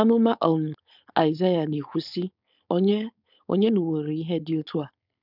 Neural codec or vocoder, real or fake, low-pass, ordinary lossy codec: codec, 16 kHz, 4.8 kbps, FACodec; fake; 5.4 kHz; none